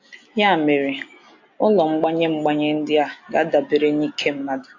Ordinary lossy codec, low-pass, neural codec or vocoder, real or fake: AAC, 48 kbps; 7.2 kHz; none; real